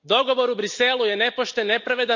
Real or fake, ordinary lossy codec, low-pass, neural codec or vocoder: real; none; 7.2 kHz; none